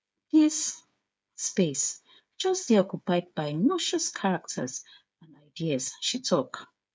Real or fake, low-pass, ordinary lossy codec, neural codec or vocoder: fake; none; none; codec, 16 kHz, 8 kbps, FreqCodec, smaller model